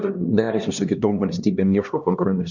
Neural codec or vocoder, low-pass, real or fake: codec, 16 kHz, 1 kbps, X-Codec, HuBERT features, trained on LibriSpeech; 7.2 kHz; fake